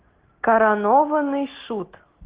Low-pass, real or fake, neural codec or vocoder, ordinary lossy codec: 3.6 kHz; real; none; Opus, 16 kbps